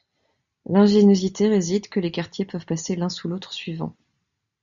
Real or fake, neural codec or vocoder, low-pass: real; none; 7.2 kHz